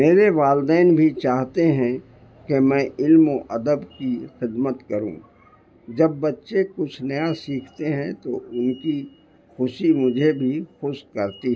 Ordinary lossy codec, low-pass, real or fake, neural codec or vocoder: none; none; real; none